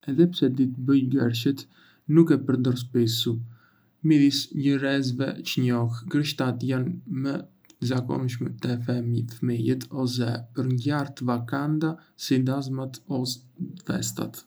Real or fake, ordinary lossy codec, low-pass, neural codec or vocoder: real; none; none; none